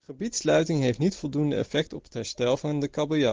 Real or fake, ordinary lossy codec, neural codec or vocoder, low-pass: real; Opus, 16 kbps; none; 7.2 kHz